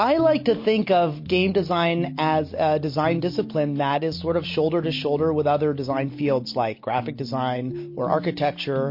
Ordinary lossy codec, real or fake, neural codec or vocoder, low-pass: MP3, 32 kbps; real; none; 5.4 kHz